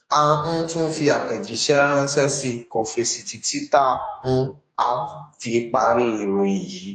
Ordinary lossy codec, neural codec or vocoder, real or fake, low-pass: AAC, 64 kbps; codec, 44.1 kHz, 2.6 kbps, DAC; fake; 9.9 kHz